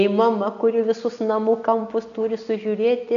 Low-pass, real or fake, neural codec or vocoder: 7.2 kHz; real; none